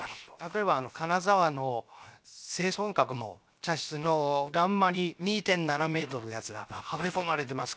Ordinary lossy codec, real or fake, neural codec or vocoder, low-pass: none; fake; codec, 16 kHz, 0.7 kbps, FocalCodec; none